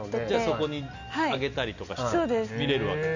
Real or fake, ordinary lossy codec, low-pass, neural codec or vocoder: real; none; 7.2 kHz; none